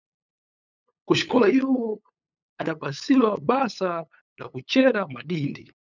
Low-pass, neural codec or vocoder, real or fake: 7.2 kHz; codec, 16 kHz, 8 kbps, FunCodec, trained on LibriTTS, 25 frames a second; fake